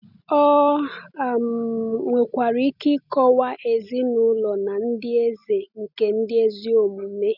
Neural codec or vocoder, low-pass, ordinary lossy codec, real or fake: none; 5.4 kHz; none; real